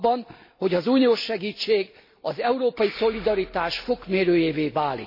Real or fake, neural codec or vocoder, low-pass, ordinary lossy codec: real; none; 5.4 kHz; MP3, 24 kbps